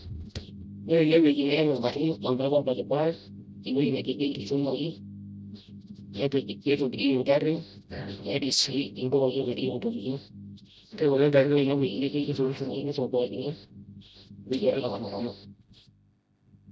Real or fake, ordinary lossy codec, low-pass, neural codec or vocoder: fake; none; none; codec, 16 kHz, 0.5 kbps, FreqCodec, smaller model